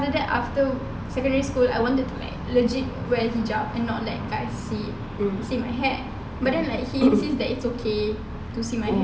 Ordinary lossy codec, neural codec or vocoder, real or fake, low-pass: none; none; real; none